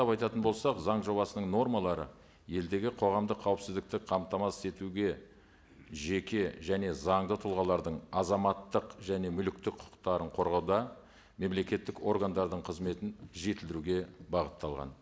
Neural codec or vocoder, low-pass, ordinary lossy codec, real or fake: none; none; none; real